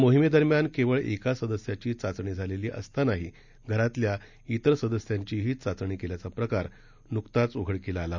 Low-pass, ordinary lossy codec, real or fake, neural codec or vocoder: 7.2 kHz; none; real; none